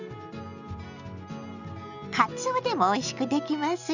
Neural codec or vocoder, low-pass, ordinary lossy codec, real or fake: none; 7.2 kHz; none; real